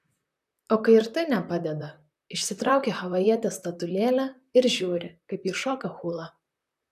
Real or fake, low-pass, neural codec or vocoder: fake; 14.4 kHz; vocoder, 44.1 kHz, 128 mel bands, Pupu-Vocoder